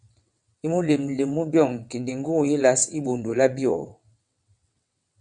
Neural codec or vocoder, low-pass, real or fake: vocoder, 22.05 kHz, 80 mel bands, WaveNeXt; 9.9 kHz; fake